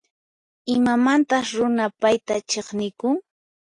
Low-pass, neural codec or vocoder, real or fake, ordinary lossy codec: 10.8 kHz; none; real; AAC, 48 kbps